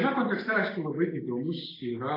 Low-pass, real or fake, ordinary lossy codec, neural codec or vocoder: 5.4 kHz; real; AAC, 24 kbps; none